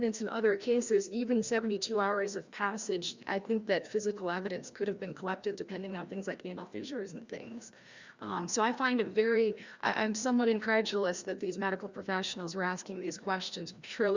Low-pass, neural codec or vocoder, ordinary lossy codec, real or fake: 7.2 kHz; codec, 16 kHz, 1 kbps, FreqCodec, larger model; Opus, 64 kbps; fake